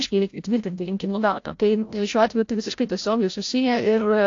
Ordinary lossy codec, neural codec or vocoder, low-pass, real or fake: AAC, 48 kbps; codec, 16 kHz, 0.5 kbps, FreqCodec, larger model; 7.2 kHz; fake